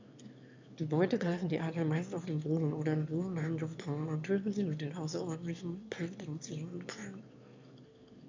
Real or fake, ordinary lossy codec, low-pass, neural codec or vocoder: fake; MP3, 64 kbps; 7.2 kHz; autoencoder, 22.05 kHz, a latent of 192 numbers a frame, VITS, trained on one speaker